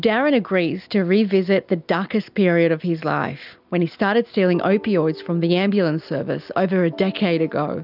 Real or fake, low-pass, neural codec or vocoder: real; 5.4 kHz; none